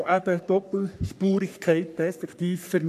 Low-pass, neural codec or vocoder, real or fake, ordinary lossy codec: 14.4 kHz; codec, 44.1 kHz, 3.4 kbps, Pupu-Codec; fake; none